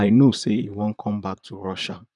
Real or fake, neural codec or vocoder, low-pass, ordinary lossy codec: fake; vocoder, 44.1 kHz, 128 mel bands, Pupu-Vocoder; 10.8 kHz; none